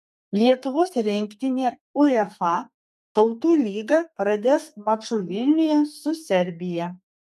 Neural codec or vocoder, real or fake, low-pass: codec, 32 kHz, 1.9 kbps, SNAC; fake; 14.4 kHz